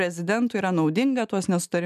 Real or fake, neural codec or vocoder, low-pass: real; none; 14.4 kHz